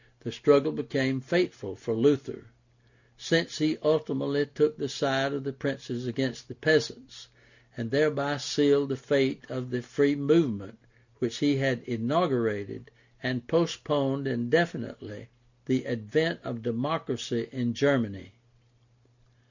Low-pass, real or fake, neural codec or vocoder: 7.2 kHz; real; none